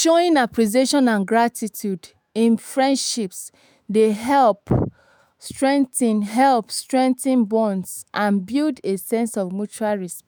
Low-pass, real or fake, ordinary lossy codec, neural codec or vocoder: none; fake; none; autoencoder, 48 kHz, 128 numbers a frame, DAC-VAE, trained on Japanese speech